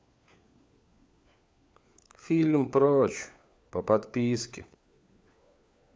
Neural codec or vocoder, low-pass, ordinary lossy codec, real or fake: codec, 16 kHz, 4 kbps, FunCodec, trained on LibriTTS, 50 frames a second; none; none; fake